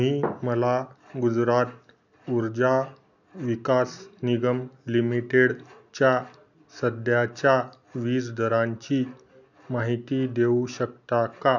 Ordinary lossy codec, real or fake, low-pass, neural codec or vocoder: none; real; 7.2 kHz; none